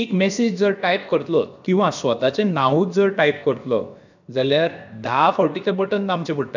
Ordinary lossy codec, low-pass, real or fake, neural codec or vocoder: none; 7.2 kHz; fake; codec, 16 kHz, about 1 kbps, DyCAST, with the encoder's durations